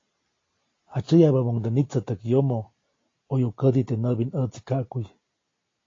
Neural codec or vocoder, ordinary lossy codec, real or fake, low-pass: none; AAC, 32 kbps; real; 7.2 kHz